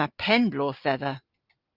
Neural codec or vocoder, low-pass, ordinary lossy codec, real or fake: none; 5.4 kHz; Opus, 24 kbps; real